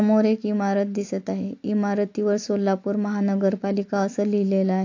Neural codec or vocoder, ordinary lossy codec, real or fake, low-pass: none; AAC, 48 kbps; real; 7.2 kHz